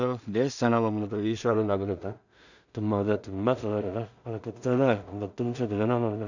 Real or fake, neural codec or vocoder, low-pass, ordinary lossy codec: fake; codec, 16 kHz in and 24 kHz out, 0.4 kbps, LongCat-Audio-Codec, two codebook decoder; 7.2 kHz; none